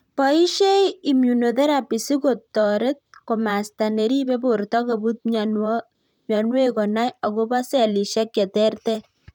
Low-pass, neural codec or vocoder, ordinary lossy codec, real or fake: 19.8 kHz; vocoder, 44.1 kHz, 128 mel bands, Pupu-Vocoder; none; fake